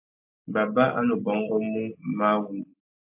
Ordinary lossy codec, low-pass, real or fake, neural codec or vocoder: AAC, 32 kbps; 3.6 kHz; real; none